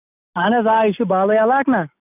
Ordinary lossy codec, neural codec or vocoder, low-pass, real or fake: none; none; 3.6 kHz; real